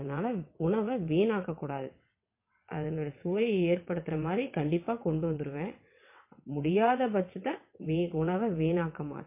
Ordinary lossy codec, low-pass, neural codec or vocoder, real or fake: MP3, 24 kbps; 3.6 kHz; vocoder, 22.05 kHz, 80 mel bands, WaveNeXt; fake